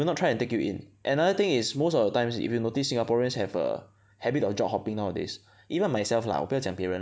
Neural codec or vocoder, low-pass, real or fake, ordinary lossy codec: none; none; real; none